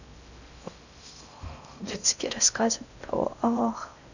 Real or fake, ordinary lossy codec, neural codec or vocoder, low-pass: fake; none; codec, 16 kHz in and 24 kHz out, 0.6 kbps, FocalCodec, streaming, 4096 codes; 7.2 kHz